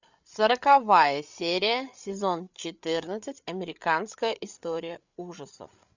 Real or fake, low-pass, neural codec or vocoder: fake; 7.2 kHz; codec, 16 kHz, 8 kbps, FreqCodec, larger model